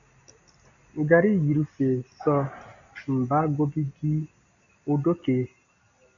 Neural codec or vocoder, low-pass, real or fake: none; 7.2 kHz; real